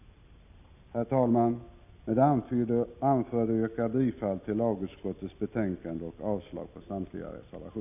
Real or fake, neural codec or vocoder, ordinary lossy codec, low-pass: real; none; none; 3.6 kHz